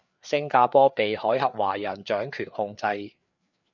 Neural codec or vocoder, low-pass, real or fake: codec, 16 kHz, 4 kbps, FreqCodec, larger model; 7.2 kHz; fake